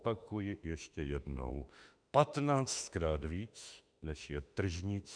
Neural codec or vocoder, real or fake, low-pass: autoencoder, 48 kHz, 32 numbers a frame, DAC-VAE, trained on Japanese speech; fake; 9.9 kHz